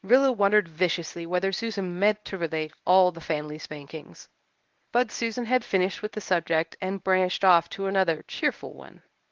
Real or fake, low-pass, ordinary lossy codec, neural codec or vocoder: fake; 7.2 kHz; Opus, 32 kbps; codec, 24 kHz, 0.9 kbps, WavTokenizer, medium speech release version 1